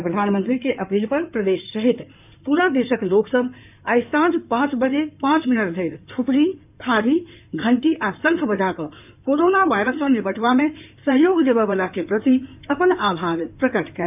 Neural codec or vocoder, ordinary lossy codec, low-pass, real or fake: codec, 16 kHz in and 24 kHz out, 2.2 kbps, FireRedTTS-2 codec; none; 3.6 kHz; fake